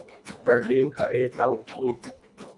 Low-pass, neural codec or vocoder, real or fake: 10.8 kHz; codec, 24 kHz, 1.5 kbps, HILCodec; fake